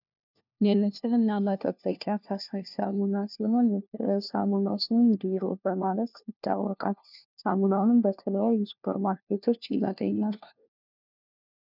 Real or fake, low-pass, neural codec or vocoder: fake; 5.4 kHz; codec, 16 kHz, 1 kbps, FunCodec, trained on LibriTTS, 50 frames a second